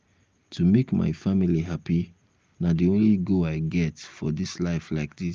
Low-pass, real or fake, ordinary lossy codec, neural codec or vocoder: 7.2 kHz; real; Opus, 32 kbps; none